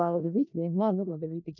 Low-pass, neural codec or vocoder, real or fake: 7.2 kHz; codec, 16 kHz in and 24 kHz out, 0.4 kbps, LongCat-Audio-Codec, four codebook decoder; fake